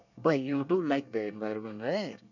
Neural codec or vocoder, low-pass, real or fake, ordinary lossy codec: codec, 24 kHz, 1 kbps, SNAC; 7.2 kHz; fake; none